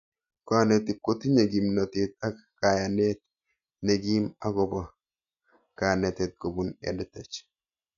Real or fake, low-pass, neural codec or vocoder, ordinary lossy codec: real; 7.2 kHz; none; none